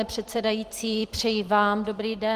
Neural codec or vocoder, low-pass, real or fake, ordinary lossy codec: vocoder, 44.1 kHz, 128 mel bands every 256 samples, BigVGAN v2; 14.4 kHz; fake; Opus, 24 kbps